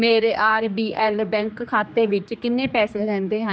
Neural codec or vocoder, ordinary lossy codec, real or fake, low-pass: codec, 16 kHz, 2 kbps, X-Codec, HuBERT features, trained on general audio; none; fake; none